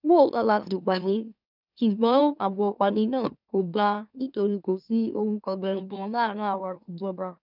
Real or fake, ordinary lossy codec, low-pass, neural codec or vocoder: fake; none; 5.4 kHz; autoencoder, 44.1 kHz, a latent of 192 numbers a frame, MeloTTS